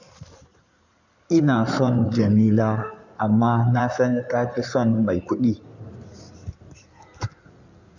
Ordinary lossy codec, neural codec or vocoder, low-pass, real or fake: none; codec, 16 kHz in and 24 kHz out, 2.2 kbps, FireRedTTS-2 codec; 7.2 kHz; fake